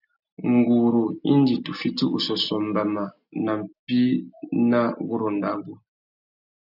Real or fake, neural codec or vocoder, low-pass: real; none; 5.4 kHz